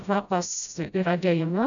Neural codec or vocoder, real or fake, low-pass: codec, 16 kHz, 0.5 kbps, FreqCodec, smaller model; fake; 7.2 kHz